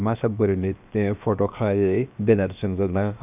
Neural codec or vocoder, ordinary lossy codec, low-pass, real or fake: codec, 16 kHz, 0.7 kbps, FocalCodec; none; 3.6 kHz; fake